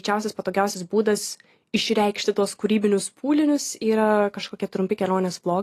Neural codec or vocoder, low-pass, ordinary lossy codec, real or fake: none; 14.4 kHz; AAC, 48 kbps; real